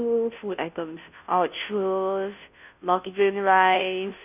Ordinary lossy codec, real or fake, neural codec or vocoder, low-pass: none; fake; codec, 16 kHz, 0.5 kbps, FunCodec, trained on Chinese and English, 25 frames a second; 3.6 kHz